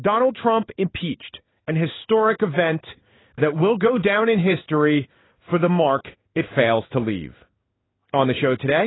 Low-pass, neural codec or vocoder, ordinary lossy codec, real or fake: 7.2 kHz; codec, 16 kHz in and 24 kHz out, 1 kbps, XY-Tokenizer; AAC, 16 kbps; fake